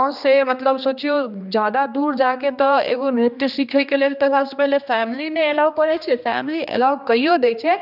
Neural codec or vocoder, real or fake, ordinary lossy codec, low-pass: codec, 16 kHz, 2 kbps, X-Codec, HuBERT features, trained on general audio; fake; none; 5.4 kHz